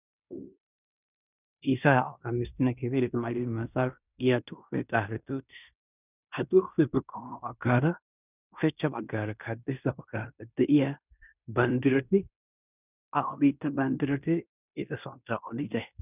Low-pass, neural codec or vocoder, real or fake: 3.6 kHz; codec, 16 kHz in and 24 kHz out, 0.9 kbps, LongCat-Audio-Codec, fine tuned four codebook decoder; fake